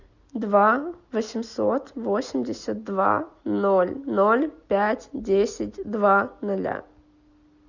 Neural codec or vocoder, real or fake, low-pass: none; real; 7.2 kHz